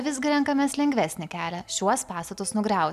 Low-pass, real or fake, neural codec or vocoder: 14.4 kHz; real; none